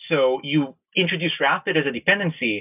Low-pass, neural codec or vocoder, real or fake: 3.6 kHz; none; real